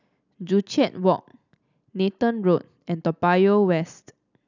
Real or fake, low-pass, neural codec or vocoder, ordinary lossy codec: real; 7.2 kHz; none; none